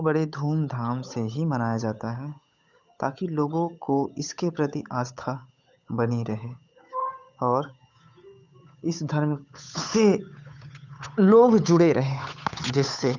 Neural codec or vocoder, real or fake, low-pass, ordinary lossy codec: codec, 16 kHz, 8 kbps, FunCodec, trained on Chinese and English, 25 frames a second; fake; 7.2 kHz; none